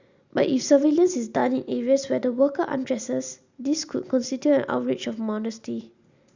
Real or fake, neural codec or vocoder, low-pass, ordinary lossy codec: real; none; 7.2 kHz; Opus, 64 kbps